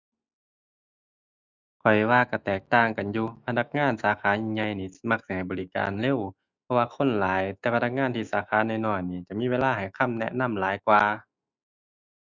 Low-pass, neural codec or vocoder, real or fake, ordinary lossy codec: 7.2 kHz; autoencoder, 48 kHz, 128 numbers a frame, DAC-VAE, trained on Japanese speech; fake; Opus, 64 kbps